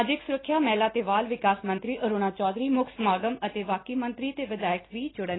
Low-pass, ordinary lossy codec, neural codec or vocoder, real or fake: 7.2 kHz; AAC, 16 kbps; none; real